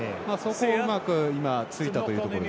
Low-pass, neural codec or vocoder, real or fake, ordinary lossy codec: none; none; real; none